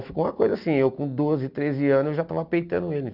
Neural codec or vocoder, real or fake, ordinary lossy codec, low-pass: none; real; none; 5.4 kHz